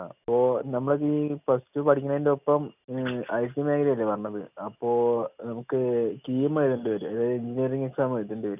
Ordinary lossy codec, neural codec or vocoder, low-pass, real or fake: none; none; 3.6 kHz; real